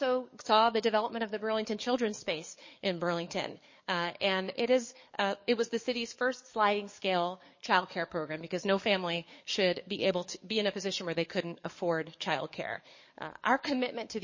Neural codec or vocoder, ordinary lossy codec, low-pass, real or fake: codec, 44.1 kHz, 7.8 kbps, DAC; MP3, 32 kbps; 7.2 kHz; fake